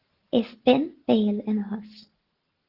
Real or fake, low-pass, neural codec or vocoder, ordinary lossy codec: real; 5.4 kHz; none; Opus, 16 kbps